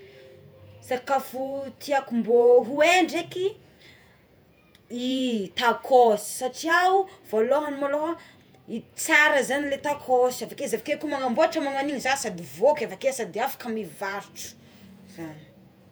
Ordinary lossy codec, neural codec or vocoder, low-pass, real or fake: none; vocoder, 48 kHz, 128 mel bands, Vocos; none; fake